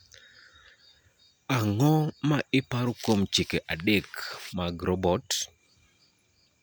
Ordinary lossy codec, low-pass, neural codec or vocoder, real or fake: none; none; none; real